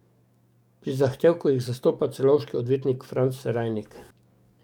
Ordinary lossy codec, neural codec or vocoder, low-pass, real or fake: none; codec, 44.1 kHz, 7.8 kbps, DAC; 19.8 kHz; fake